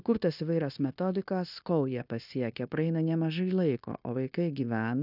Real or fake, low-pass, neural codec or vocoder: fake; 5.4 kHz; codec, 16 kHz, 0.9 kbps, LongCat-Audio-Codec